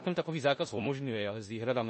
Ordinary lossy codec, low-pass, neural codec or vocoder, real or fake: MP3, 32 kbps; 10.8 kHz; codec, 16 kHz in and 24 kHz out, 0.9 kbps, LongCat-Audio-Codec, four codebook decoder; fake